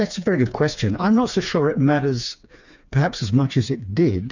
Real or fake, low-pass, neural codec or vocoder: fake; 7.2 kHz; codec, 16 kHz, 4 kbps, FreqCodec, smaller model